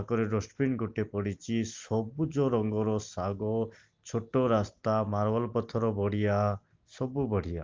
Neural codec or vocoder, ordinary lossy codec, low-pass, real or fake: none; Opus, 24 kbps; 7.2 kHz; real